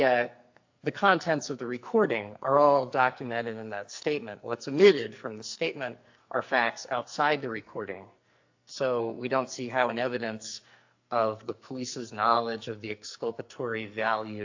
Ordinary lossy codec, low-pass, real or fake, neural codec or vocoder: AAC, 48 kbps; 7.2 kHz; fake; codec, 44.1 kHz, 2.6 kbps, SNAC